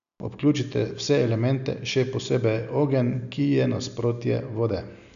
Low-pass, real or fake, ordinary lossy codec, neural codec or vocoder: 7.2 kHz; real; none; none